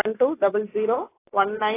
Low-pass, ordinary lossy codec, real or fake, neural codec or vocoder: 3.6 kHz; AAC, 16 kbps; real; none